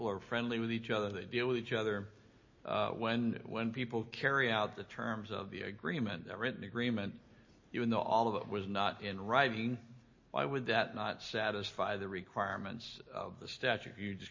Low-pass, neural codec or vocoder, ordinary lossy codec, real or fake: 7.2 kHz; none; MP3, 32 kbps; real